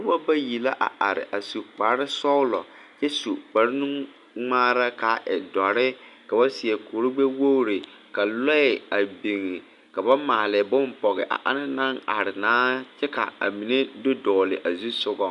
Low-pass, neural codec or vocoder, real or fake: 10.8 kHz; none; real